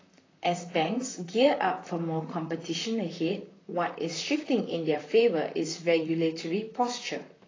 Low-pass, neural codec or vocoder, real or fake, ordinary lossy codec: 7.2 kHz; vocoder, 44.1 kHz, 128 mel bands, Pupu-Vocoder; fake; AAC, 32 kbps